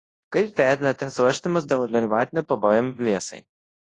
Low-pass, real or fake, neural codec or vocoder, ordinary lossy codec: 10.8 kHz; fake; codec, 24 kHz, 0.9 kbps, WavTokenizer, large speech release; AAC, 32 kbps